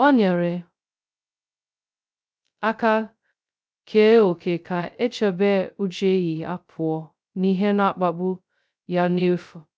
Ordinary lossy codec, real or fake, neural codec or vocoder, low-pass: none; fake; codec, 16 kHz, 0.2 kbps, FocalCodec; none